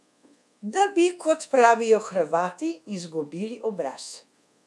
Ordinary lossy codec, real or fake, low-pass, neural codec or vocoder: none; fake; none; codec, 24 kHz, 1.2 kbps, DualCodec